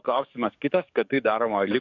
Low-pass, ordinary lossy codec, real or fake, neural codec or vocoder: 7.2 kHz; AAC, 48 kbps; fake; codec, 44.1 kHz, 7.8 kbps, DAC